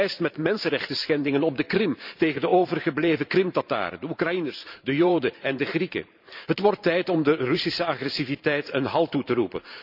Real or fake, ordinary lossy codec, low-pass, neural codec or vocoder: fake; none; 5.4 kHz; vocoder, 44.1 kHz, 128 mel bands every 512 samples, BigVGAN v2